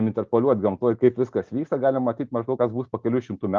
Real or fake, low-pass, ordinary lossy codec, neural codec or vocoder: real; 7.2 kHz; Opus, 32 kbps; none